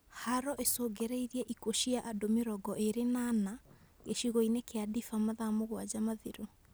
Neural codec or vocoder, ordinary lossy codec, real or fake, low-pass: none; none; real; none